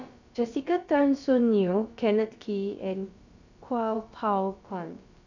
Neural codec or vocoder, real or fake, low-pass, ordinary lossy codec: codec, 16 kHz, about 1 kbps, DyCAST, with the encoder's durations; fake; 7.2 kHz; none